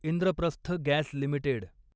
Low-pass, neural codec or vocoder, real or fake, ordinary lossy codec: none; none; real; none